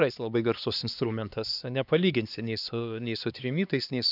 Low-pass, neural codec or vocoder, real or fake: 5.4 kHz; codec, 16 kHz, 2 kbps, X-Codec, HuBERT features, trained on LibriSpeech; fake